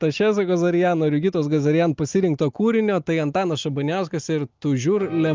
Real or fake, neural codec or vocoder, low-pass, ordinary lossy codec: real; none; 7.2 kHz; Opus, 24 kbps